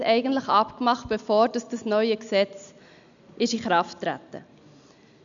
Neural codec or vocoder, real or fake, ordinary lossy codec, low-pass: none; real; none; 7.2 kHz